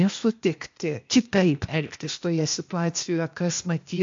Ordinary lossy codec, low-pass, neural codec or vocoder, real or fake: MP3, 48 kbps; 7.2 kHz; codec, 16 kHz, 0.8 kbps, ZipCodec; fake